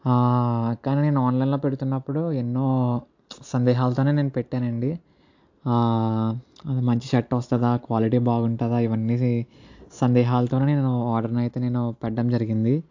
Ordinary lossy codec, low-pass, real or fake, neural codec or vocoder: AAC, 48 kbps; 7.2 kHz; real; none